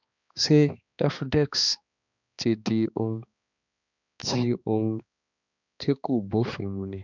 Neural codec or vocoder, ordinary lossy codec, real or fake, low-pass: codec, 16 kHz, 2 kbps, X-Codec, HuBERT features, trained on balanced general audio; none; fake; 7.2 kHz